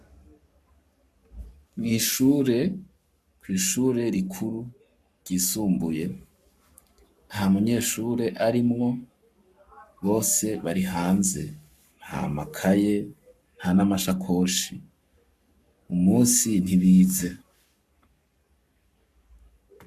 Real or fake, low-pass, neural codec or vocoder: fake; 14.4 kHz; codec, 44.1 kHz, 7.8 kbps, Pupu-Codec